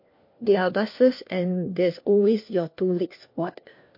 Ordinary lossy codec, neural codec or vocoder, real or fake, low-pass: MP3, 32 kbps; codec, 16 kHz, 1 kbps, FunCodec, trained on LibriTTS, 50 frames a second; fake; 5.4 kHz